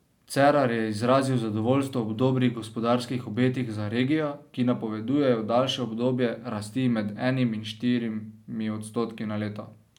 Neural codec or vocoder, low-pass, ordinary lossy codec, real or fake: none; 19.8 kHz; none; real